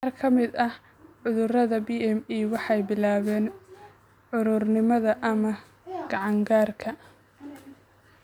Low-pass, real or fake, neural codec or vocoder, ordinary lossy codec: 19.8 kHz; real; none; none